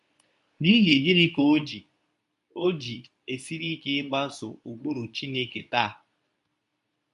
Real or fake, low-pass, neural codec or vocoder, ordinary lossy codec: fake; 10.8 kHz; codec, 24 kHz, 0.9 kbps, WavTokenizer, medium speech release version 2; none